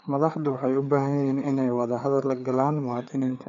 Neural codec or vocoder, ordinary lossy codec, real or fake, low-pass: codec, 16 kHz, 4 kbps, FreqCodec, larger model; none; fake; 7.2 kHz